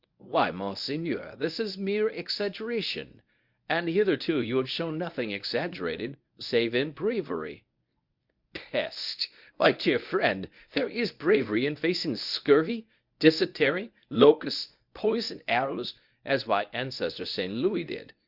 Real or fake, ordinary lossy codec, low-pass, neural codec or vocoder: fake; Opus, 64 kbps; 5.4 kHz; codec, 24 kHz, 0.9 kbps, WavTokenizer, medium speech release version 1